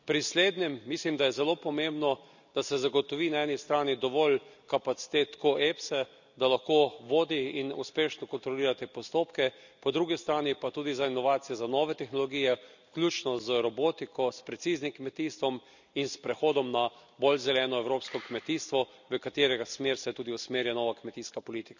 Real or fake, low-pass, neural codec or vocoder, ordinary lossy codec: real; 7.2 kHz; none; none